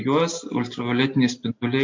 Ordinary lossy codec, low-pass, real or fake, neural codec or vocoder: MP3, 48 kbps; 7.2 kHz; real; none